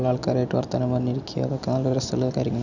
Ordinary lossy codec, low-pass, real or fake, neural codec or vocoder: none; 7.2 kHz; real; none